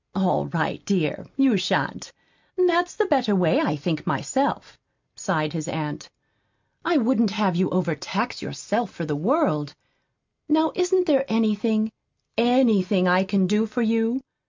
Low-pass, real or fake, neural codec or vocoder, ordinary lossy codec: 7.2 kHz; real; none; MP3, 64 kbps